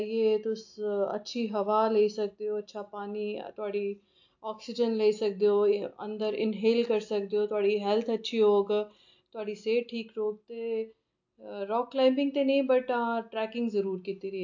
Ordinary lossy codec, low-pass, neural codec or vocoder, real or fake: AAC, 48 kbps; 7.2 kHz; none; real